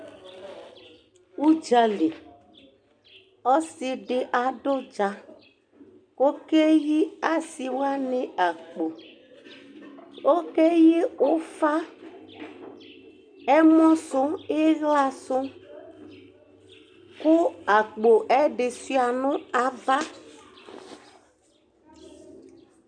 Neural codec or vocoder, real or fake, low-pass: none; real; 9.9 kHz